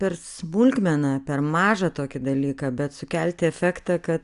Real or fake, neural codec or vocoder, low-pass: real; none; 10.8 kHz